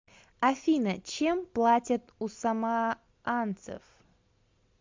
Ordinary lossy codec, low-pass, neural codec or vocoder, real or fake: MP3, 64 kbps; 7.2 kHz; none; real